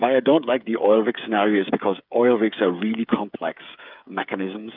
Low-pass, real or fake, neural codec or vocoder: 5.4 kHz; fake; codec, 16 kHz, 8 kbps, FreqCodec, smaller model